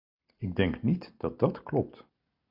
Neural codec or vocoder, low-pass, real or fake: none; 5.4 kHz; real